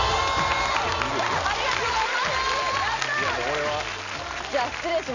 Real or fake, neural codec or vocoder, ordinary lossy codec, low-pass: real; none; none; 7.2 kHz